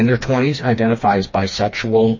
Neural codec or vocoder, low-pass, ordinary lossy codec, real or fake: codec, 16 kHz, 2 kbps, FreqCodec, smaller model; 7.2 kHz; MP3, 32 kbps; fake